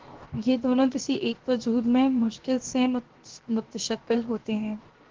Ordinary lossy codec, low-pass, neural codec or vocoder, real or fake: Opus, 16 kbps; 7.2 kHz; codec, 16 kHz, 0.7 kbps, FocalCodec; fake